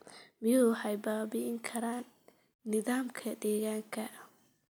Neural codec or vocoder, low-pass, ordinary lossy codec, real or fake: none; none; none; real